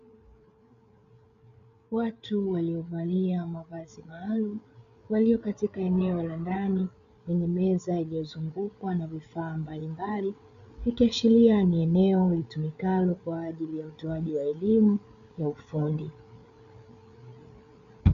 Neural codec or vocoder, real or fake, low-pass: codec, 16 kHz, 8 kbps, FreqCodec, larger model; fake; 7.2 kHz